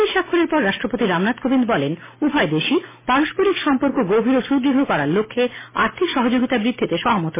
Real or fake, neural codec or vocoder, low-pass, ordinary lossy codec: real; none; 3.6 kHz; MP3, 16 kbps